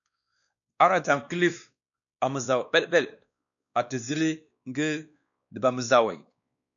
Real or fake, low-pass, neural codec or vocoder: fake; 7.2 kHz; codec, 16 kHz, 2 kbps, X-Codec, WavLM features, trained on Multilingual LibriSpeech